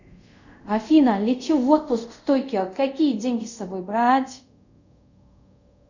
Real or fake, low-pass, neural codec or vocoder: fake; 7.2 kHz; codec, 24 kHz, 0.5 kbps, DualCodec